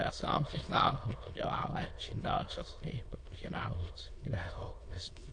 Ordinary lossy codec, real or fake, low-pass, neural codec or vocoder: AAC, 48 kbps; fake; 9.9 kHz; autoencoder, 22.05 kHz, a latent of 192 numbers a frame, VITS, trained on many speakers